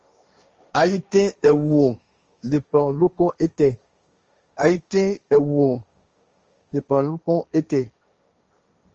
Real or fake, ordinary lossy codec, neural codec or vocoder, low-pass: fake; Opus, 24 kbps; codec, 16 kHz, 1.1 kbps, Voila-Tokenizer; 7.2 kHz